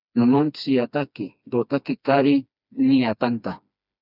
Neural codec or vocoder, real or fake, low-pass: codec, 16 kHz, 2 kbps, FreqCodec, smaller model; fake; 5.4 kHz